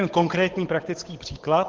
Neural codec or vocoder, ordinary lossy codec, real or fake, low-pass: codec, 16 kHz, 8 kbps, FunCodec, trained on Chinese and English, 25 frames a second; Opus, 16 kbps; fake; 7.2 kHz